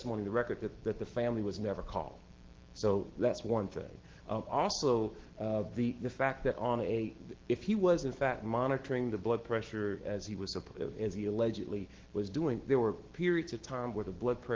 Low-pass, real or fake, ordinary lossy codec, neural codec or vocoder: 7.2 kHz; fake; Opus, 16 kbps; autoencoder, 48 kHz, 128 numbers a frame, DAC-VAE, trained on Japanese speech